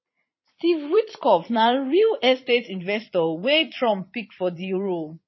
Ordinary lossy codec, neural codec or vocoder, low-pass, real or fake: MP3, 24 kbps; vocoder, 22.05 kHz, 80 mel bands, Vocos; 7.2 kHz; fake